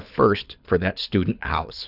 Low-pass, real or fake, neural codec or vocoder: 5.4 kHz; fake; codec, 24 kHz, 6 kbps, HILCodec